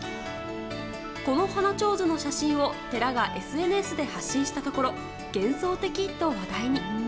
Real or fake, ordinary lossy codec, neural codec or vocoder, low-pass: real; none; none; none